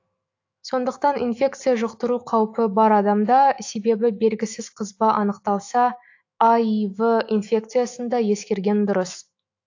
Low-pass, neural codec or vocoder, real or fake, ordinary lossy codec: 7.2 kHz; autoencoder, 48 kHz, 128 numbers a frame, DAC-VAE, trained on Japanese speech; fake; AAC, 48 kbps